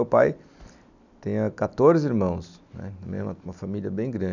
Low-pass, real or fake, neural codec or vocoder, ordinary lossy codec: 7.2 kHz; real; none; none